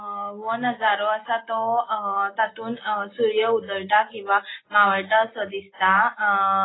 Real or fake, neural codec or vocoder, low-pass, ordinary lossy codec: real; none; 7.2 kHz; AAC, 16 kbps